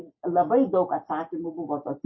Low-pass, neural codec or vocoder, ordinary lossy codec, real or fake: 7.2 kHz; none; MP3, 24 kbps; real